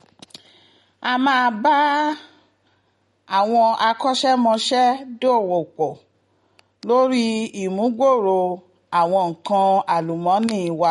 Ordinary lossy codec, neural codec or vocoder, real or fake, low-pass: MP3, 48 kbps; none; real; 14.4 kHz